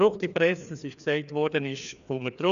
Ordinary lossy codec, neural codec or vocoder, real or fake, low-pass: none; codec, 16 kHz, 2 kbps, FreqCodec, larger model; fake; 7.2 kHz